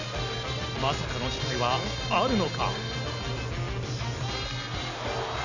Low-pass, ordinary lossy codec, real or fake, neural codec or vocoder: 7.2 kHz; none; real; none